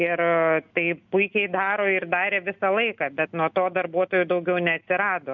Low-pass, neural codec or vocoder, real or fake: 7.2 kHz; none; real